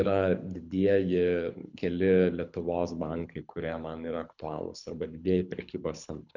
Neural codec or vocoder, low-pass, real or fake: codec, 24 kHz, 6 kbps, HILCodec; 7.2 kHz; fake